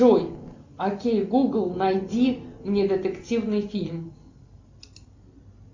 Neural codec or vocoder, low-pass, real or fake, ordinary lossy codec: none; 7.2 kHz; real; MP3, 64 kbps